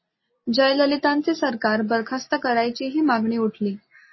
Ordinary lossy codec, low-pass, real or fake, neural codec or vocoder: MP3, 24 kbps; 7.2 kHz; real; none